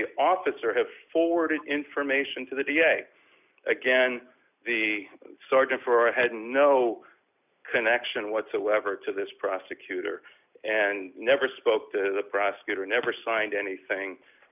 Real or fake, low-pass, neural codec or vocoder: real; 3.6 kHz; none